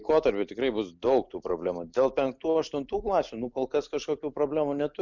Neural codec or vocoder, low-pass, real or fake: none; 7.2 kHz; real